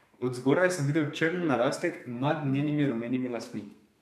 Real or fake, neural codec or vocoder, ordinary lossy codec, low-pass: fake; codec, 32 kHz, 1.9 kbps, SNAC; none; 14.4 kHz